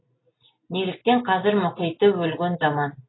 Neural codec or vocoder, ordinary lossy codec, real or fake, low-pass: none; AAC, 16 kbps; real; 7.2 kHz